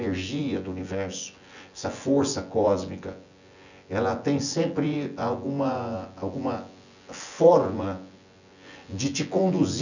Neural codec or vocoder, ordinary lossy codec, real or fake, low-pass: vocoder, 24 kHz, 100 mel bands, Vocos; none; fake; 7.2 kHz